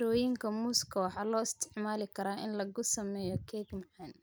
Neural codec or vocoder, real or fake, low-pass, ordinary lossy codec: none; real; none; none